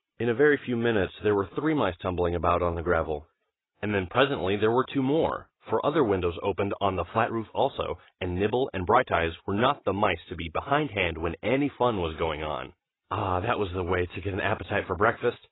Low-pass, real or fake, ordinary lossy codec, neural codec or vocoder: 7.2 kHz; real; AAC, 16 kbps; none